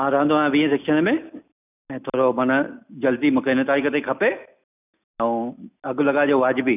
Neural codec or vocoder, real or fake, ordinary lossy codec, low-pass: none; real; none; 3.6 kHz